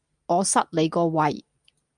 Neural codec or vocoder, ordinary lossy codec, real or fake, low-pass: none; Opus, 32 kbps; real; 9.9 kHz